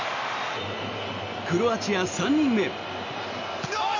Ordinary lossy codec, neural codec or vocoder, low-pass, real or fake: none; none; 7.2 kHz; real